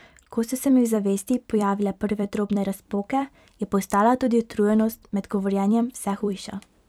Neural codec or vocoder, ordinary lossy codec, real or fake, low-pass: vocoder, 44.1 kHz, 128 mel bands every 256 samples, BigVGAN v2; none; fake; 19.8 kHz